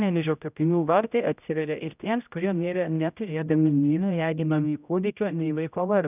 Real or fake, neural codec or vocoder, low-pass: fake; codec, 16 kHz, 0.5 kbps, X-Codec, HuBERT features, trained on general audio; 3.6 kHz